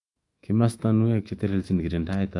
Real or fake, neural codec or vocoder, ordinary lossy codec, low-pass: fake; autoencoder, 48 kHz, 128 numbers a frame, DAC-VAE, trained on Japanese speech; AAC, 48 kbps; 10.8 kHz